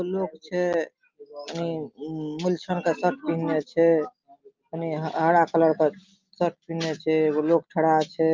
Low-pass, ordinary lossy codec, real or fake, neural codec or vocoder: 7.2 kHz; Opus, 24 kbps; real; none